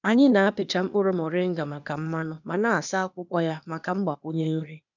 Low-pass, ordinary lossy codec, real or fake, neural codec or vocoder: 7.2 kHz; none; fake; codec, 16 kHz, 0.8 kbps, ZipCodec